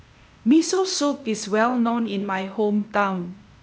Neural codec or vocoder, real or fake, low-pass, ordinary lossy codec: codec, 16 kHz, 0.8 kbps, ZipCodec; fake; none; none